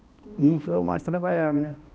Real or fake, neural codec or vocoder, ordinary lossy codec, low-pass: fake; codec, 16 kHz, 1 kbps, X-Codec, HuBERT features, trained on balanced general audio; none; none